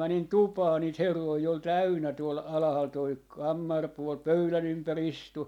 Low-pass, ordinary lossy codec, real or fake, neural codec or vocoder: 19.8 kHz; MP3, 96 kbps; real; none